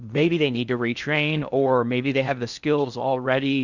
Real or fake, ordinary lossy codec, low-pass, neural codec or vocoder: fake; Opus, 64 kbps; 7.2 kHz; codec, 16 kHz in and 24 kHz out, 0.6 kbps, FocalCodec, streaming, 4096 codes